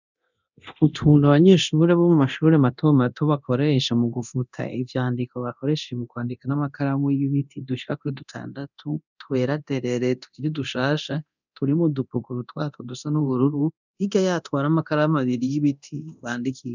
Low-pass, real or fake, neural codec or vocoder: 7.2 kHz; fake; codec, 24 kHz, 0.9 kbps, DualCodec